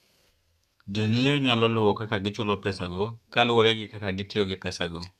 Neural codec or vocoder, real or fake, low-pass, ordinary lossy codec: codec, 32 kHz, 1.9 kbps, SNAC; fake; 14.4 kHz; none